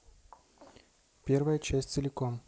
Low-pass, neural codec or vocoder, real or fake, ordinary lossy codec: none; none; real; none